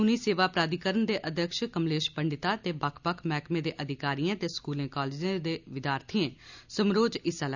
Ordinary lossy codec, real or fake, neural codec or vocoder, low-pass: none; real; none; 7.2 kHz